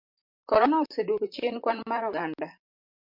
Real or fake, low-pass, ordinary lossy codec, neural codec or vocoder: fake; 5.4 kHz; MP3, 48 kbps; vocoder, 44.1 kHz, 128 mel bands, Pupu-Vocoder